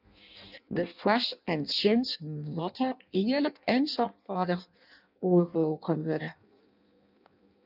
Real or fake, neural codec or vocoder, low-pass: fake; codec, 16 kHz in and 24 kHz out, 0.6 kbps, FireRedTTS-2 codec; 5.4 kHz